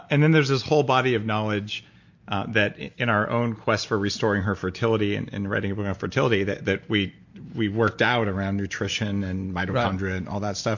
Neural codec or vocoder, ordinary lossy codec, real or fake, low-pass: none; MP3, 48 kbps; real; 7.2 kHz